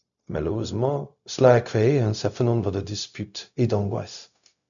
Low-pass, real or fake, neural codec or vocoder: 7.2 kHz; fake; codec, 16 kHz, 0.4 kbps, LongCat-Audio-Codec